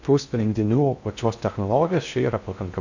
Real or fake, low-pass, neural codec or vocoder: fake; 7.2 kHz; codec, 16 kHz in and 24 kHz out, 0.6 kbps, FocalCodec, streaming, 2048 codes